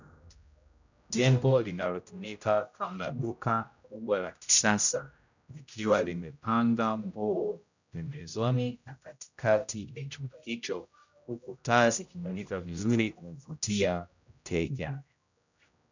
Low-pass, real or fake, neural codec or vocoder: 7.2 kHz; fake; codec, 16 kHz, 0.5 kbps, X-Codec, HuBERT features, trained on general audio